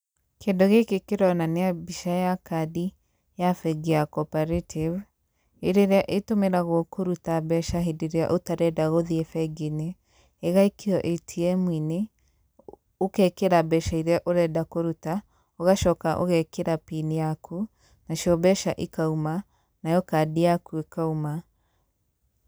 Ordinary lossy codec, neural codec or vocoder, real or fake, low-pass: none; none; real; none